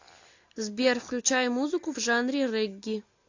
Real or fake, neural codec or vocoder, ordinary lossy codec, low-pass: real; none; AAC, 48 kbps; 7.2 kHz